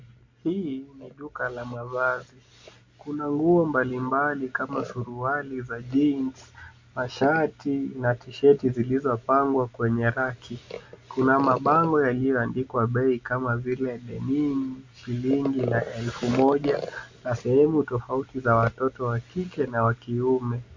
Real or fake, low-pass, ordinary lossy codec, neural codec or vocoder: real; 7.2 kHz; MP3, 64 kbps; none